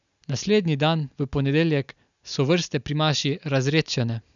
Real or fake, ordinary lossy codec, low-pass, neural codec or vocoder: real; none; 7.2 kHz; none